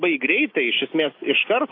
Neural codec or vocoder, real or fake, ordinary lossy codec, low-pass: none; real; AAC, 32 kbps; 5.4 kHz